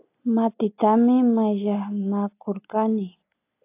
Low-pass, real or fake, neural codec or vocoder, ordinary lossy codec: 3.6 kHz; real; none; AAC, 24 kbps